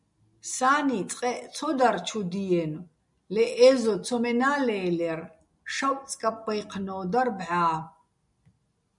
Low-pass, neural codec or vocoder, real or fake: 10.8 kHz; none; real